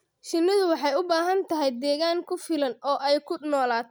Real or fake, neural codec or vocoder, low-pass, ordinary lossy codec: real; none; none; none